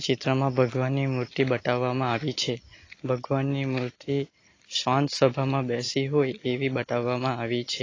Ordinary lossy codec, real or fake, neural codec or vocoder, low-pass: AAC, 32 kbps; real; none; 7.2 kHz